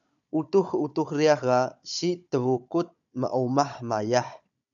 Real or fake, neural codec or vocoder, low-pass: fake; codec, 16 kHz, 16 kbps, FunCodec, trained on Chinese and English, 50 frames a second; 7.2 kHz